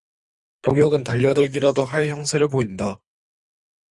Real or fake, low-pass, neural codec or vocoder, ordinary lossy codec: fake; 10.8 kHz; codec, 24 kHz, 3 kbps, HILCodec; Opus, 64 kbps